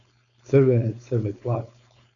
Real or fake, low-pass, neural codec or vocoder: fake; 7.2 kHz; codec, 16 kHz, 4.8 kbps, FACodec